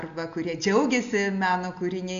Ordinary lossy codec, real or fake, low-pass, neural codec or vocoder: AAC, 64 kbps; real; 7.2 kHz; none